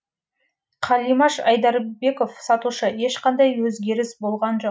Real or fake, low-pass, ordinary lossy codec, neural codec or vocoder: real; none; none; none